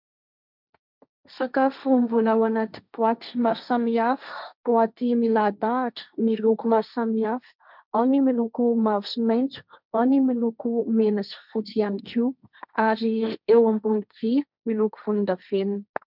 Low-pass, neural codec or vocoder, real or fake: 5.4 kHz; codec, 16 kHz, 1.1 kbps, Voila-Tokenizer; fake